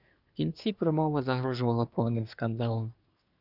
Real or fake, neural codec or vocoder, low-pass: fake; codec, 24 kHz, 1 kbps, SNAC; 5.4 kHz